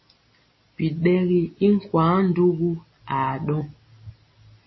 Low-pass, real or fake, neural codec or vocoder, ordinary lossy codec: 7.2 kHz; real; none; MP3, 24 kbps